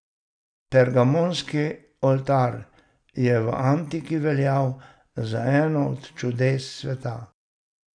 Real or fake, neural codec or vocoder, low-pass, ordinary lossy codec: real; none; 9.9 kHz; none